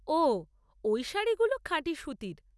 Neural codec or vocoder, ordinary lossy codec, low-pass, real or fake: none; none; none; real